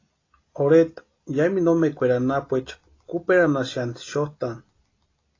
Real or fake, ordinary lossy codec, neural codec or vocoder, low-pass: real; AAC, 32 kbps; none; 7.2 kHz